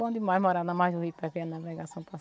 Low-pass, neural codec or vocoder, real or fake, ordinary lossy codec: none; none; real; none